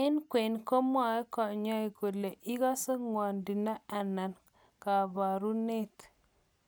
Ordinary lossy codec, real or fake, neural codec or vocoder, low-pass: none; real; none; none